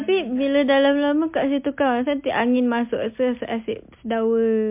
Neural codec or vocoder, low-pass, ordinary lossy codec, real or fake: none; 3.6 kHz; MP3, 32 kbps; real